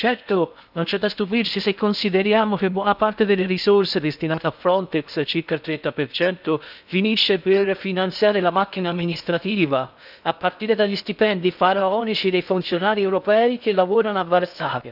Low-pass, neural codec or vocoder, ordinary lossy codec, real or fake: 5.4 kHz; codec, 16 kHz in and 24 kHz out, 0.6 kbps, FocalCodec, streaming, 2048 codes; none; fake